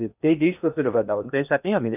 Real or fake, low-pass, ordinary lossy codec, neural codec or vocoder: fake; 3.6 kHz; none; codec, 16 kHz in and 24 kHz out, 0.6 kbps, FocalCodec, streaming, 2048 codes